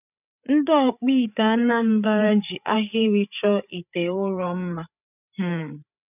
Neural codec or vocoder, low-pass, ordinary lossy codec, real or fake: codec, 16 kHz, 8 kbps, FreqCodec, larger model; 3.6 kHz; none; fake